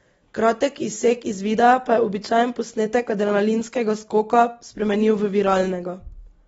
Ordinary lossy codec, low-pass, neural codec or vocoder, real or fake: AAC, 24 kbps; 19.8 kHz; none; real